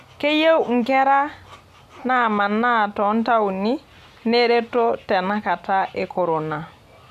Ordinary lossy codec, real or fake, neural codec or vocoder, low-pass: none; real; none; 14.4 kHz